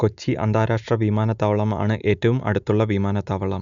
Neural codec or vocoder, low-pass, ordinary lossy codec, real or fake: none; 7.2 kHz; none; real